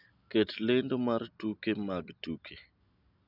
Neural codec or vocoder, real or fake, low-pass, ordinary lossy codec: none; real; 5.4 kHz; none